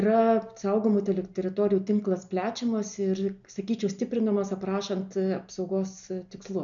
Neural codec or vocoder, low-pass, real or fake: none; 7.2 kHz; real